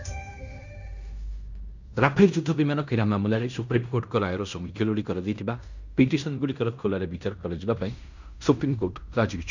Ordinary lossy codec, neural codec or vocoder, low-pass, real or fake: none; codec, 16 kHz in and 24 kHz out, 0.9 kbps, LongCat-Audio-Codec, fine tuned four codebook decoder; 7.2 kHz; fake